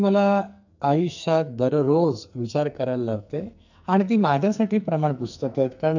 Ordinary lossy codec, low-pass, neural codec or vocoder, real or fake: none; 7.2 kHz; codec, 44.1 kHz, 2.6 kbps, SNAC; fake